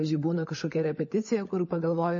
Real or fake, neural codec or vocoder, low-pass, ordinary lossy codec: fake; codec, 16 kHz, 16 kbps, FunCodec, trained on LibriTTS, 50 frames a second; 7.2 kHz; MP3, 32 kbps